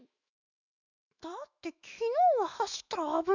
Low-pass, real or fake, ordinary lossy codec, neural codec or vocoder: 7.2 kHz; fake; none; autoencoder, 48 kHz, 128 numbers a frame, DAC-VAE, trained on Japanese speech